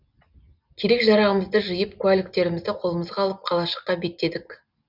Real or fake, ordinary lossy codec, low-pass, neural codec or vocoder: real; none; 5.4 kHz; none